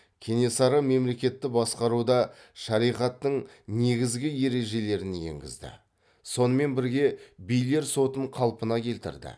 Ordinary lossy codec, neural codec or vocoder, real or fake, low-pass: none; none; real; none